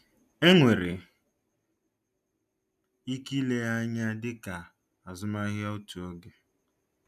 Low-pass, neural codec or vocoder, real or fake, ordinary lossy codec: 14.4 kHz; none; real; none